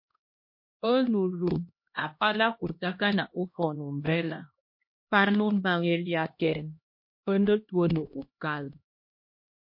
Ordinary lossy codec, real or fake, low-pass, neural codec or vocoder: MP3, 32 kbps; fake; 5.4 kHz; codec, 16 kHz, 1 kbps, X-Codec, HuBERT features, trained on LibriSpeech